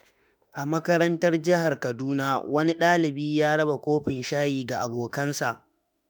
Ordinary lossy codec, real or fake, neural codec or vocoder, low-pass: none; fake; autoencoder, 48 kHz, 32 numbers a frame, DAC-VAE, trained on Japanese speech; none